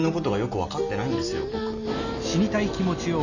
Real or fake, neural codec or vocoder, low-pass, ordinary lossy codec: real; none; 7.2 kHz; none